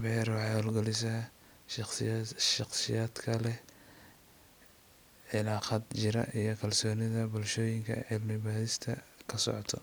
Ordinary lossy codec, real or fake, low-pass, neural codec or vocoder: none; real; none; none